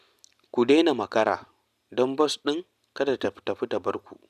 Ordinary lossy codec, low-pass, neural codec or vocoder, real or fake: none; 14.4 kHz; none; real